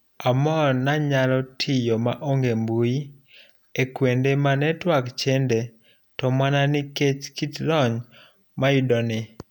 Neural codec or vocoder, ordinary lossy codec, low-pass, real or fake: none; none; 19.8 kHz; real